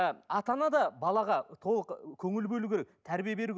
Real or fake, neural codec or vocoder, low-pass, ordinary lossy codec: real; none; none; none